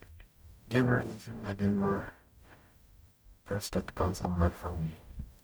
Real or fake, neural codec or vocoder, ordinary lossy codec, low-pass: fake; codec, 44.1 kHz, 0.9 kbps, DAC; none; none